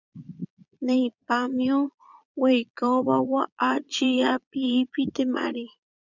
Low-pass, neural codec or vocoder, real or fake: 7.2 kHz; vocoder, 22.05 kHz, 80 mel bands, Vocos; fake